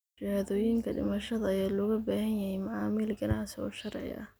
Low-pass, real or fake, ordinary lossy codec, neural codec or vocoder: none; real; none; none